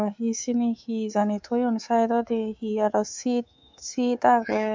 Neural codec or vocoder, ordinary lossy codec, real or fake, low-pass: codec, 24 kHz, 3.1 kbps, DualCodec; MP3, 64 kbps; fake; 7.2 kHz